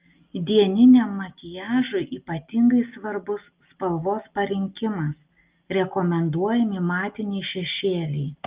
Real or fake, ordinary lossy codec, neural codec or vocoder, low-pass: real; Opus, 32 kbps; none; 3.6 kHz